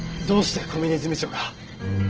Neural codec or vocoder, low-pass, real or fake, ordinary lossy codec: none; 7.2 kHz; real; Opus, 16 kbps